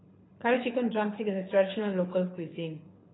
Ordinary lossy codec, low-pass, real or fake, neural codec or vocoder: AAC, 16 kbps; 7.2 kHz; fake; codec, 24 kHz, 6 kbps, HILCodec